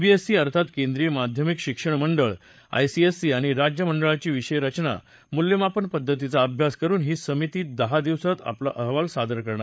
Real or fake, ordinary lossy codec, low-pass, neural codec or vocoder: fake; none; none; codec, 16 kHz, 8 kbps, FreqCodec, larger model